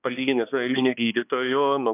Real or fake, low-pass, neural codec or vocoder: fake; 3.6 kHz; codec, 16 kHz, 2 kbps, X-Codec, HuBERT features, trained on balanced general audio